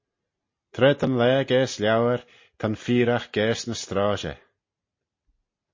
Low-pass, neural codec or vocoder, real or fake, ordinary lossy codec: 7.2 kHz; none; real; MP3, 32 kbps